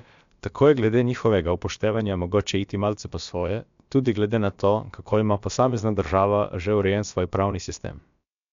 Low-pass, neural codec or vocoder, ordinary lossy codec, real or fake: 7.2 kHz; codec, 16 kHz, about 1 kbps, DyCAST, with the encoder's durations; MP3, 64 kbps; fake